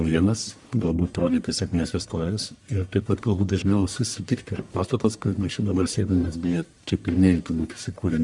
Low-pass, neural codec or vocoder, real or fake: 10.8 kHz; codec, 44.1 kHz, 1.7 kbps, Pupu-Codec; fake